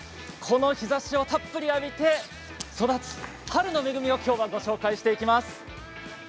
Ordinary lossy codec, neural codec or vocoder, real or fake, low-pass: none; none; real; none